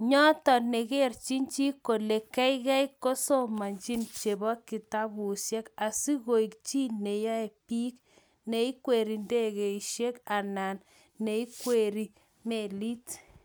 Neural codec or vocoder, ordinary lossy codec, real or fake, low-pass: none; none; real; none